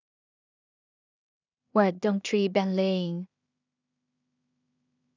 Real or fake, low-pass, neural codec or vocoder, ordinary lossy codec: fake; 7.2 kHz; codec, 16 kHz in and 24 kHz out, 0.4 kbps, LongCat-Audio-Codec, two codebook decoder; none